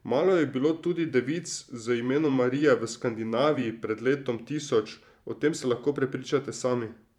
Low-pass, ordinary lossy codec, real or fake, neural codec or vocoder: 19.8 kHz; none; fake; vocoder, 44.1 kHz, 128 mel bands every 512 samples, BigVGAN v2